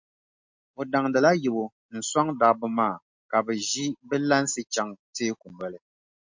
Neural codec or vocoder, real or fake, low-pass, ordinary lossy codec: none; real; 7.2 kHz; MP3, 48 kbps